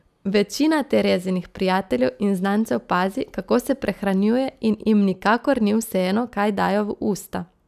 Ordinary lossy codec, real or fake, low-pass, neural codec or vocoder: none; real; 14.4 kHz; none